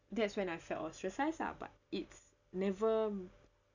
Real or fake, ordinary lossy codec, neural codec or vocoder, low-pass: real; none; none; 7.2 kHz